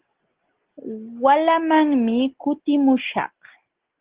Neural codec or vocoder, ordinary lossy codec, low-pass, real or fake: none; Opus, 16 kbps; 3.6 kHz; real